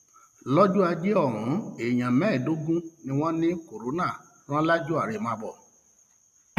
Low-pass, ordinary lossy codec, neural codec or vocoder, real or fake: 14.4 kHz; none; none; real